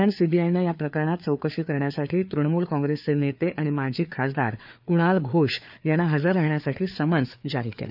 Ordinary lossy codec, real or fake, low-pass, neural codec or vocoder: none; fake; 5.4 kHz; codec, 16 kHz, 4 kbps, FreqCodec, larger model